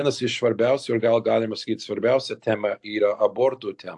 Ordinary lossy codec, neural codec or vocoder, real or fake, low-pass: AAC, 64 kbps; none; real; 10.8 kHz